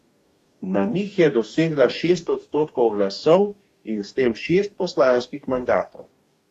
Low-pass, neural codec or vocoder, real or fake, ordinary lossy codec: 14.4 kHz; codec, 44.1 kHz, 2.6 kbps, DAC; fake; AAC, 64 kbps